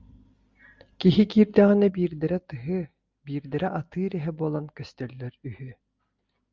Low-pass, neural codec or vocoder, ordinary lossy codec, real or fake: 7.2 kHz; none; Opus, 32 kbps; real